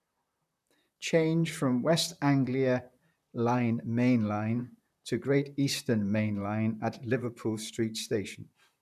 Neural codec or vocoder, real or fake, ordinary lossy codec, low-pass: vocoder, 44.1 kHz, 128 mel bands, Pupu-Vocoder; fake; none; 14.4 kHz